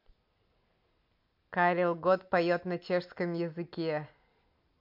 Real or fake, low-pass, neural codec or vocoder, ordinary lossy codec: real; 5.4 kHz; none; MP3, 48 kbps